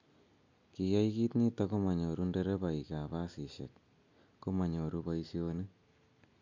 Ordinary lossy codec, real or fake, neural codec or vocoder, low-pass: MP3, 64 kbps; real; none; 7.2 kHz